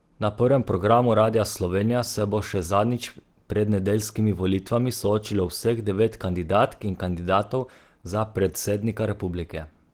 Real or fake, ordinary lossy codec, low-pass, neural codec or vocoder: real; Opus, 16 kbps; 19.8 kHz; none